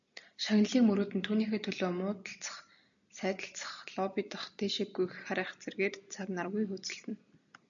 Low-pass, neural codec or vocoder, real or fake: 7.2 kHz; none; real